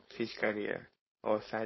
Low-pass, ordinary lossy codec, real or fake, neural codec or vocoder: 7.2 kHz; MP3, 24 kbps; fake; codec, 16 kHz, 4.8 kbps, FACodec